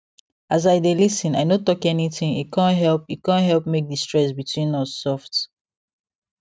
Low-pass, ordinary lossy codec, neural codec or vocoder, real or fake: none; none; none; real